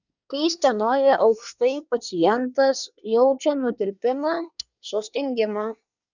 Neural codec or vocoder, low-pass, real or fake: codec, 24 kHz, 1 kbps, SNAC; 7.2 kHz; fake